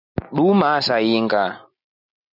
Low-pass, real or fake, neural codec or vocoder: 5.4 kHz; real; none